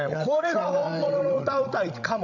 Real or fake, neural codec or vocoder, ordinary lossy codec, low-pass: fake; codec, 16 kHz, 16 kbps, FreqCodec, larger model; none; 7.2 kHz